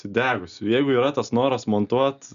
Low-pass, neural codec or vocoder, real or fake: 7.2 kHz; none; real